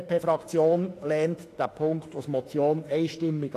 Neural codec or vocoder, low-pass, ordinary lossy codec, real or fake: autoencoder, 48 kHz, 32 numbers a frame, DAC-VAE, trained on Japanese speech; 14.4 kHz; AAC, 48 kbps; fake